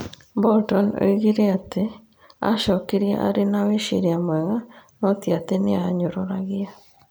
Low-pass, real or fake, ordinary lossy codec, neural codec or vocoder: none; real; none; none